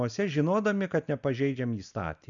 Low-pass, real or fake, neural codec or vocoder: 7.2 kHz; real; none